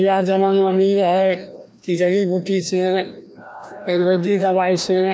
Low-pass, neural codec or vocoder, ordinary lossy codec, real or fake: none; codec, 16 kHz, 1 kbps, FreqCodec, larger model; none; fake